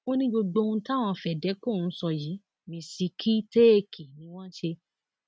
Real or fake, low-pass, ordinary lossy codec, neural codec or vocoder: real; none; none; none